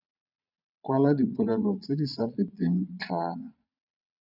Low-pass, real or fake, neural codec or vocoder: 5.4 kHz; fake; vocoder, 22.05 kHz, 80 mel bands, Vocos